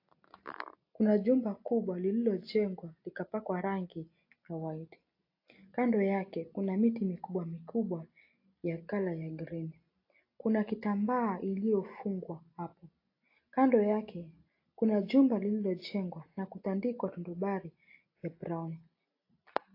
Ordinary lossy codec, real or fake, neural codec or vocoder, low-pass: AAC, 32 kbps; real; none; 5.4 kHz